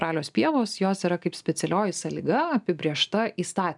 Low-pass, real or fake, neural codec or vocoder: 10.8 kHz; real; none